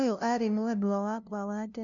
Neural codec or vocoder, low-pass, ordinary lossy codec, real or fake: codec, 16 kHz, 0.5 kbps, FunCodec, trained on LibriTTS, 25 frames a second; 7.2 kHz; none; fake